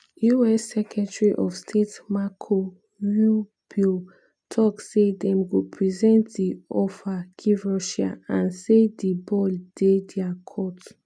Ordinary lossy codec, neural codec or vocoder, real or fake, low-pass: none; none; real; 9.9 kHz